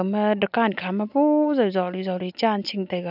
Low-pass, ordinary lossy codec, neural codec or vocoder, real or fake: 5.4 kHz; none; none; real